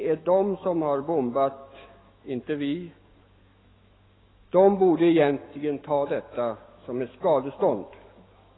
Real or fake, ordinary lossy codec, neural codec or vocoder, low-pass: fake; AAC, 16 kbps; autoencoder, 48 kHz, 128 numbers a frame, DAC-VAE, trained on Japanese speech; 7.2 kHz